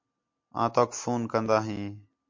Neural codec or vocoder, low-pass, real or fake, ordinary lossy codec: none; 7.2 kHz; real; MP3, 48 kbps